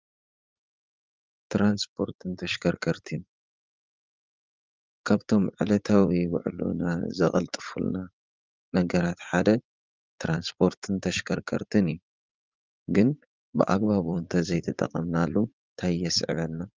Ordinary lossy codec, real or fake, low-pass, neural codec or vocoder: Opus, 32 kbps; fake; 7.2 kHz; vocoder, 44.1 kHz, 80 mel bands, Vocos